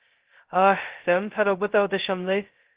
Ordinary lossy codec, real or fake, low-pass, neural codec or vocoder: Opus, 16 kbps; fake; 3.6 kHz; codec, 16 kHz, 0.2 kbps, FocalCodec